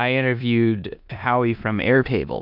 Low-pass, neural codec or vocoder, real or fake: 5.4 kHz; codec, 16 kHz in and 24 kHz out, 0.9 kbps, LongCat-Audio-Codec, four codebook decoder; fake